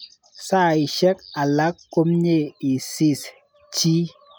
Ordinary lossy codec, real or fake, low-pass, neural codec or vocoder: none; real; none; none